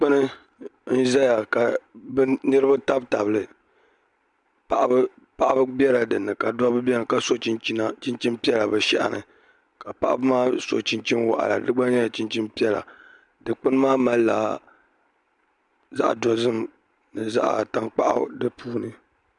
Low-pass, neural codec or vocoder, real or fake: 10.8 kHz; none; real